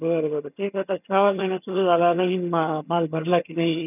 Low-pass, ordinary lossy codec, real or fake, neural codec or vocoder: 3.6 kHz; none; fake; vocoder, 22.05 kHz, 80 mel bands, HiFi-GAN